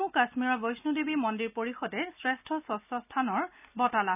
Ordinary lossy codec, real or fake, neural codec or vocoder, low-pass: none; real; none; 3.6 kHz